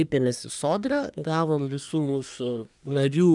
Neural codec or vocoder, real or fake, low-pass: codec, 24 kHz, 1 kbps, SNAC; fake; 10.8 kHz